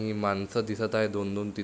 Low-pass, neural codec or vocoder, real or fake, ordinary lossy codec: none; none; real; none